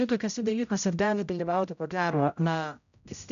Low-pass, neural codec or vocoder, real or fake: 7.2 kHz; codec, 16 kHz, 0.5 kbps, X-Codec, HuBERT features, trained on general audio; fake